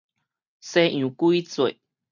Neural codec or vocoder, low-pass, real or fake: none; 7.2 kHz; real